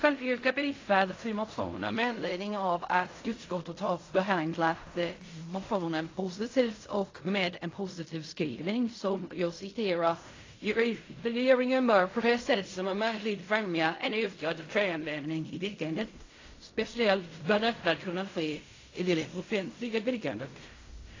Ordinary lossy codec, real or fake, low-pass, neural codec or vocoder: AAC, 32 kbps; fake; 7.2 kHz; codec, 16 kHz in and 24 kHz out, 0.4 kbps, LongCat-Audio-Codec, fine tuned four codebook decoder